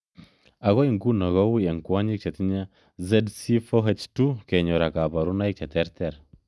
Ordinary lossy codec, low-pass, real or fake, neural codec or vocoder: none; none; real; none